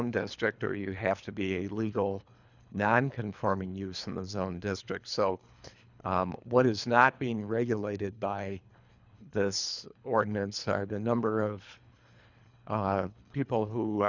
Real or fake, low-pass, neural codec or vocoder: fake; 7.2 kHz; codec, 24 kHz, 3 kbps, HILCodec